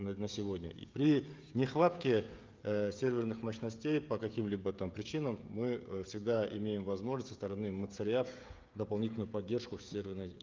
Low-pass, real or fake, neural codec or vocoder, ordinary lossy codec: 7.2 kHz; fake; codec, 16 kHz, 16 kbps, FreqCodec, smaller model; Opus, 24 kbps